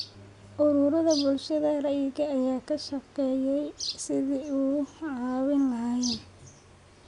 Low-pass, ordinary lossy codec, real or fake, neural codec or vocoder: 10.8 kHz; none; real; none